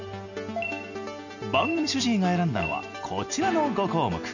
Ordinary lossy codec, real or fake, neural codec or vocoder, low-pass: none; real; none; 7.2 kHz